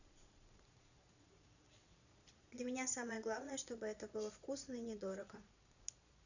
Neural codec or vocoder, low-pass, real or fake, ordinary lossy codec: vocoder, 22.05 kHz, 80 mel bands, Vocos; 7.2 kHz; fake; none